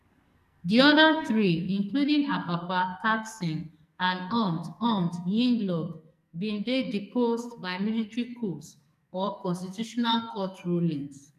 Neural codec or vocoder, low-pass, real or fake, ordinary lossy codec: codec, 44.1 kHz, 2.6 kbps, SNAC; 14.4 kHz; fake; none